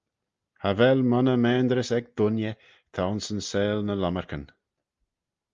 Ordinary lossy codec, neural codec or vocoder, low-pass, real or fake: Opus, 32 kbps; none; 7.2 kHz; real